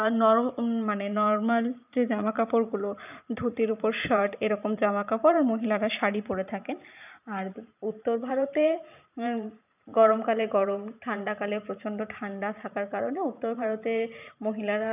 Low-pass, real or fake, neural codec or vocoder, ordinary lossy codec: 3.6 kHz; fake; vocoder, 44.1 kHz, 128 mel bands every 512 samples, BigVGAN v2; none